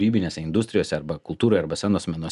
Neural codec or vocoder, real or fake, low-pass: none; real; 10.8 kHz